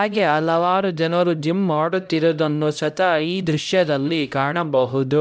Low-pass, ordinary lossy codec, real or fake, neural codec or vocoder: none; none; fake; codec, 16 kHz, 0.5 kbps, X-Codec, HuBERT features, trained on LibriSpeech